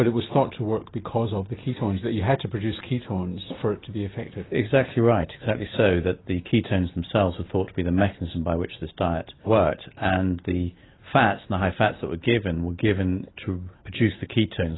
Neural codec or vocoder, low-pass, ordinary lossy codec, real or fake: none; 7.2 kHz; AAC, 16 kbps; real